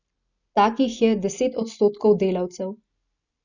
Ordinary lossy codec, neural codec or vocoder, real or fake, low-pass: none; none; real; 7.2 kHz